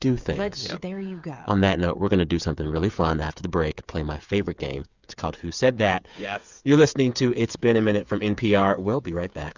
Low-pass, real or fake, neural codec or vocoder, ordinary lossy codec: 7.2 kHz; fake; codec, 16 kHz, 8 kbps, FreqCodec, smaller model; Opus, 64 kbps